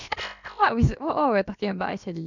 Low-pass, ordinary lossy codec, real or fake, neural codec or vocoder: 7.2 kHz; none; fake; codec, 16 kHz, about 1 kbps, DyCAST, with the encoder's durations